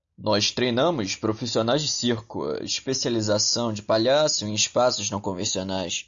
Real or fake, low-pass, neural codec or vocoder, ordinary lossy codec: real; 7.2 kHz; none; AAC, 64 kbps